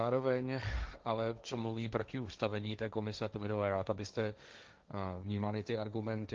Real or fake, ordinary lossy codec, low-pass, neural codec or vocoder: fake; Opus, 24 kbps; 7.2 kHz; codec, 16 kHz, 1.1 kbps, Voila-Tokenizer